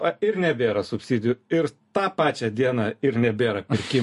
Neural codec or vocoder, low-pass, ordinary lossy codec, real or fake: none; 14.4 kHz; MP3, 48 kbps; real